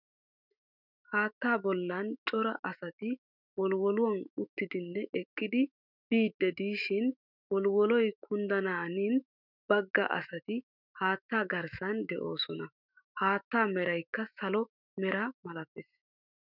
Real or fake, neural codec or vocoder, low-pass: fake; autoencoder, 48 kHz, 128 numbers a frame, DAC-VAE, trained on Japanese speech; 5.4 kHz